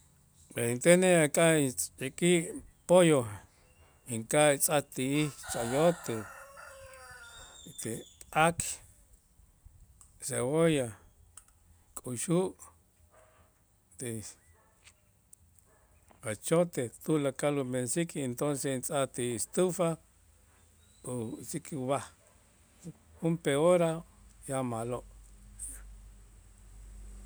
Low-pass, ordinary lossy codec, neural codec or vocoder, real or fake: none; none; none; real